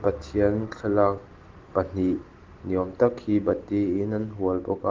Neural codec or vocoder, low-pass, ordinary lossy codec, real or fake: none; 7.2 kHz; Opus, 16 kbps; real